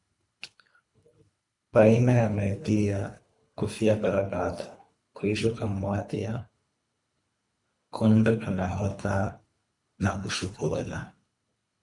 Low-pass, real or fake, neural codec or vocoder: 10.8 kHz; fake; codec, 24 kHz, 3 kbps, HILCodec